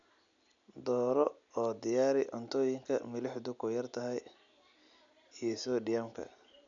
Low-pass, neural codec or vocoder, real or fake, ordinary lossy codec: 7.2 kHz; none; real; none